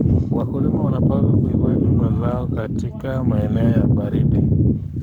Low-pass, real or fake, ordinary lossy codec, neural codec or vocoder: 19.8 kHz; fake; none; codec, 44.1 kHz, 7.8 kbps, Pupu-Codec